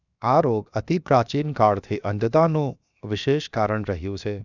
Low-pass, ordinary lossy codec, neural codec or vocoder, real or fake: 7.2 kHz; none; codec, 16 kHz, 0.7 kbps, FocalCodec; fake